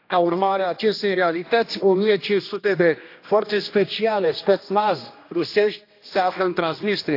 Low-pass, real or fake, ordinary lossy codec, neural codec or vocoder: 5.4 kHz; fake; AAC, 32 kbps; codec, 16 kHz, 1 kbps, X-Codec, HuBERT features, trained on general audio